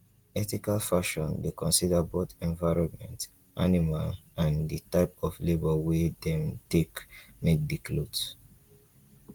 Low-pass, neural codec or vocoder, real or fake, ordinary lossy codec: 19.8 kHz; none; real; Opus, 24 kbps